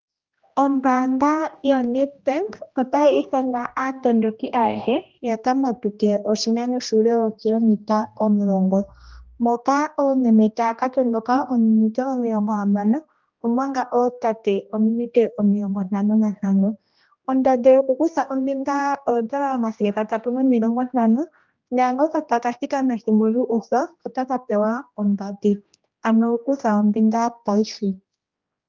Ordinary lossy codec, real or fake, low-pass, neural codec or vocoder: Opus, 24 kbps; fake; 7.2 kHz; codec, 16 kHz, 1 kbps, X-Codec, HuBERT features, trained on general audio